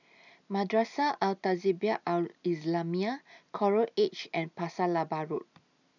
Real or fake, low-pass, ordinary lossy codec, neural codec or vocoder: real; 7.2 kHz; none; none